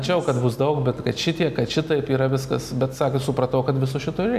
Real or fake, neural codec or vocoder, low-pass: real; none; 14.4 kHz